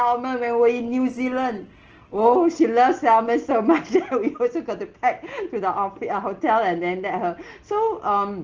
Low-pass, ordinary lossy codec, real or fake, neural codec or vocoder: 7.2 kHz; Opus, 16 kbps; real; none